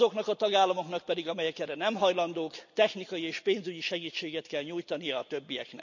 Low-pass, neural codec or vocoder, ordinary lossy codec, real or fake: 7.2 kHz; none; none; real